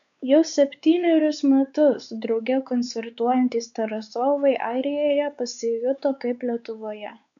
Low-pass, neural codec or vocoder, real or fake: 7.2 kHz; codec, 16 kHz, 4 kbps, X-Codec, WavLM features, trained on Multilingual LibriSpeech; fake